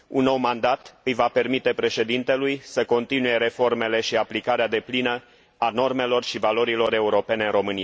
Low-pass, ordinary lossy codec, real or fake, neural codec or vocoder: none; none; real; none